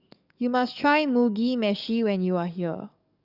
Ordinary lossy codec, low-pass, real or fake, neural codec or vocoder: none; 5.4 kHz; fake; codec, 44.1 kHz, 7.8 kbps, DAC